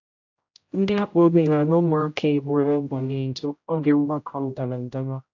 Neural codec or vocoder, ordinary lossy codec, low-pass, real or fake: codec, 16 kHz, 0.5 kbps, X-Codec, HuBERT features, trained on general audio; AAC, 48 kbps; 7.2 kHz; fake